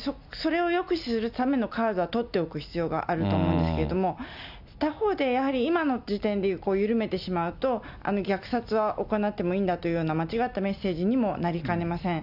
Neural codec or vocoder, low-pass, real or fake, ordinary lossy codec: none; 5.4 kHz; real; none